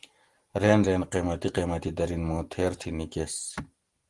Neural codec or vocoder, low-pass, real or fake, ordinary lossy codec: none; 10.8 kHz; real; Opus, 24 kbps